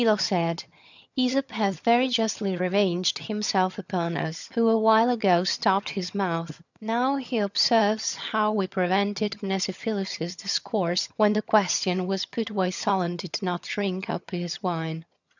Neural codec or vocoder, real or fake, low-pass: vocoder, 22.05 kHz, 80 mel bands, HiFi-GAN; fake; 7.2 kHz